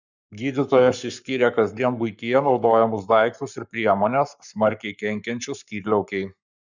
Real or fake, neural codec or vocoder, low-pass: fake; codec, 44.1 kHz, 7.8 kbps, Pupu-Codec; 7.2 kHz